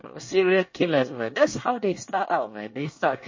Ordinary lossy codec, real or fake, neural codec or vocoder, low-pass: MP3, 32 kbps; fake; codec, 24 kHz, 1 kbps, SNAC; 7.2 kHz